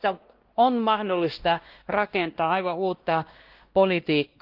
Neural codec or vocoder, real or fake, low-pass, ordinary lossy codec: codec, 16 kHz, 1 kbps, X-Codec, WavLM features, trained on Multilingual LibriSpeech; fake; 5.4 kHz; Opus, 16 kbps